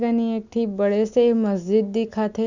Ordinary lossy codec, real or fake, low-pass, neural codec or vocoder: none; real; 7.2 kHz; none